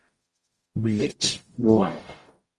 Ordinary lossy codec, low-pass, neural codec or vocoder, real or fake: Opus, 32 kbps; 10.8 kHz; codec, 44.1 kHz, 0.9 kbps, DAC; fake